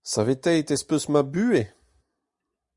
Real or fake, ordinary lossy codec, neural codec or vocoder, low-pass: real; Opus, 64 kbps; none; 10.8 kHz